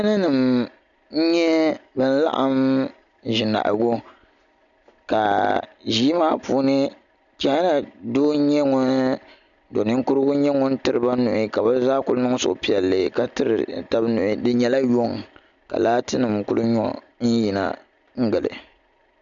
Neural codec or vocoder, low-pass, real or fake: none; 7.2 kHz; real